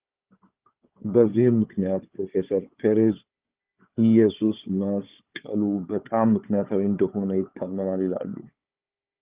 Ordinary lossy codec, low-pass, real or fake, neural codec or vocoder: Opus, 32 kbps; 3.6 kHz; fake; codec, 16 kHz, 4 kbps, FunCodec, trained on Chinese and English, 50 frames a second